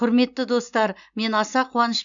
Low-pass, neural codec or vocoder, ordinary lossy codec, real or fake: 7.2 kHz; none; none; real